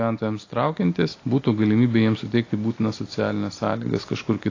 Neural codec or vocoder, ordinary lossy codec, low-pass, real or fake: none; AAC, 32 kbps; 7.2 kHz; real